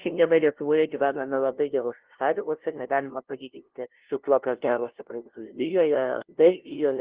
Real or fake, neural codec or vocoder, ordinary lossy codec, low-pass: fake; codec, 16 kHz, 0.5 kbps, FunCodec, trained on LibriTTS, 25 frames a second; Opus, 16 kbps; 3.6 kHz